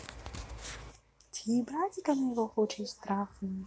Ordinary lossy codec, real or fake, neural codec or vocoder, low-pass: none; real; none; none